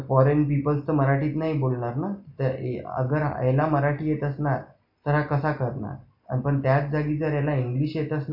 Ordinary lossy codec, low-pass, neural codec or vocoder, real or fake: AAC, 48 kbps; 5.4 kHz; none; real